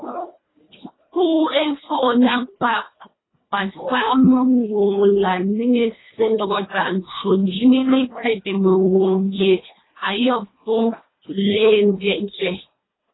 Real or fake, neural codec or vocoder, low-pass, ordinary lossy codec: fake; codec, 24 kHz, 1.5 kbps, HILCodec; 7.2 kHz; AAC, 16 kbps